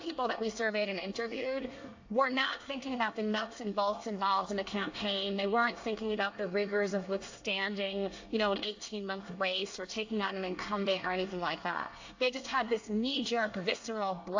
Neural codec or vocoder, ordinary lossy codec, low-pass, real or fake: codec, 24 kHz, 1 kbps, SNAC; AAC, 48 kbps; 7.2 kHz; fake